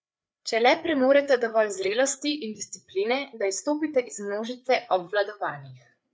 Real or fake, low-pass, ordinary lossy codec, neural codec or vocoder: fake; none; none; codec, 16 kHz, 4 kbps, FreqCodec, larger model